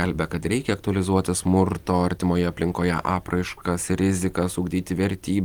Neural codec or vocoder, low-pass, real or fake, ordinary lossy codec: none; 19.8 kHz; real; Opus, 24 kbps